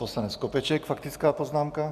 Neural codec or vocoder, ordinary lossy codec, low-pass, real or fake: none; AAC, 96 kbps; 14.4 kHz; real